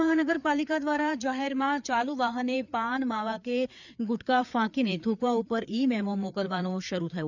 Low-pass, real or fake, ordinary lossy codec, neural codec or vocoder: 7.2 kHz; fake; none; codec, 16 kHz, 4 kbps, FreqCodec, larger model